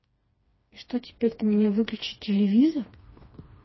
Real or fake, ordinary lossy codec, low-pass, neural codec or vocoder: fake; MP3, 24 kbps; 7.2 kHz; codec, 16 kHz, 2 kbps, FreqCodec, smaller model